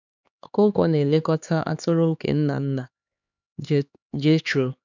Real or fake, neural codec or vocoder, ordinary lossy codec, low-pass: fake; codec, 16 kHz, 2 kbps, X-Codec, HuBERT features, trained on LibriSpeech; none; 7.2 kHz